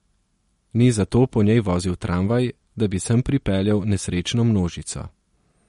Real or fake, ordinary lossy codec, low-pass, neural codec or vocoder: real; MP3, 48 kbps; 19.8 kHz; none